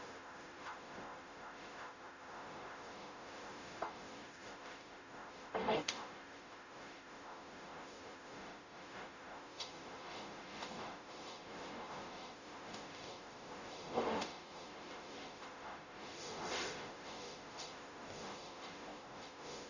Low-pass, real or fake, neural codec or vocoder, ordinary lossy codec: 7.2 kHz; fake; codec, 44.1 kHz, 0.9 kbps, DAC; none